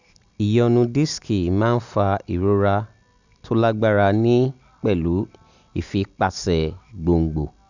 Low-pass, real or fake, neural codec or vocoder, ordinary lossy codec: 7.2 kHz; real; none; none